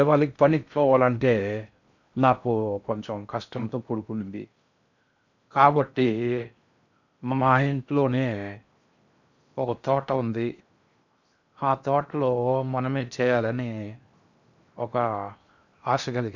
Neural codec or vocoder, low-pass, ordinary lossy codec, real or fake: codec, 16 kHz in and 24 kHz out, 0.6 kbps, FocalCodec, streaming, 4096 codes; 7.2 kHz; none; fake